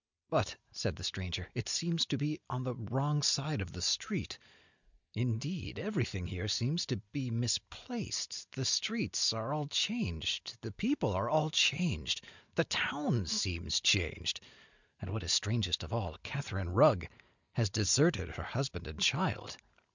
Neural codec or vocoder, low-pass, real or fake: none; 7.2 kHz; real